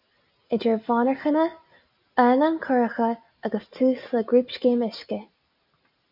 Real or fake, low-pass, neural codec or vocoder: real; 5.4 kHz; none